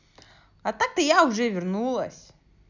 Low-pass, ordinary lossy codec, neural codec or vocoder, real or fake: 7.2 kHz; none; none; real